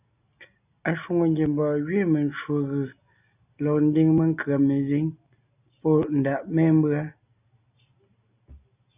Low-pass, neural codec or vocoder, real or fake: 3.6 kHz; none; real